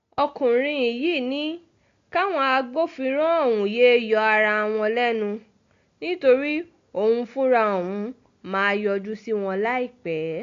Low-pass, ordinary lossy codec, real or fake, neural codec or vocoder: 7.2 kHz; AAC, 48 kbps; real; none